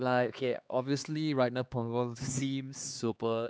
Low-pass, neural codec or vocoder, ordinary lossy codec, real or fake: none; codec, 16 kHz, 2 kbps, X-Codec, HuBERT features, trained on LibriSpeech; none; fake